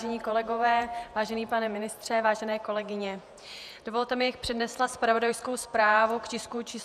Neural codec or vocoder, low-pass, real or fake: vocoder, 48 kHz, 128 mel bands, Vocos; 14.4 kHz; fake